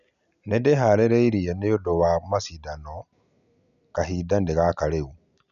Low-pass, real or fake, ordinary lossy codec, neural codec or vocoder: 7.2 kHz; real; none; none